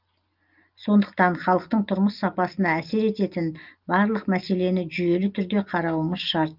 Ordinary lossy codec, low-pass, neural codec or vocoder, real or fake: Opus, 32 kbps; 5.4 kHz; none; real